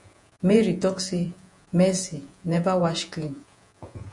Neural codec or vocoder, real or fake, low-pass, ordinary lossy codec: vocoder, 48 kHz, 128 mel bands, Vocos; fake; 10.8 kHz; MP3, 64 kbps